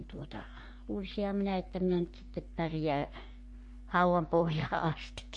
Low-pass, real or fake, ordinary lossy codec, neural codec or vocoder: 10.8 kHz; fake; MP3, 48 kbps; codec, 44.1 kHz, 3.4 kbps, Pupu-Codec